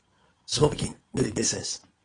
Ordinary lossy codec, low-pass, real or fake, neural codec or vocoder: AAC, 32 kbps; 9.9 kHz; fake; vocoder, 22.05 kHz, 80 mel bands, Vocos